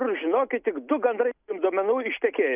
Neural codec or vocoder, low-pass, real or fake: none; 3.6 kHz; real